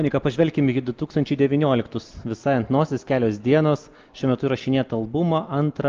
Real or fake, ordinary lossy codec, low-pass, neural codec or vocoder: real; Opus, 32 kbps; 7.2 kHz; none